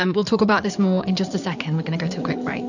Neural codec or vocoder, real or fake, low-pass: codec, 16 kHz in and 24 kHz out, 2.2 kbps, FireRedTTS-2 codec; fake; 7.2 kHz